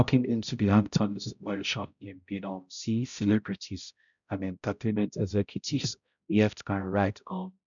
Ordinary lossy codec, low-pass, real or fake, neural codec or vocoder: none; 7.2 kHz; fake; codec, 16 kHz, 0.5 kbps, X-Codec, HuBERT features, trained on balanced general audio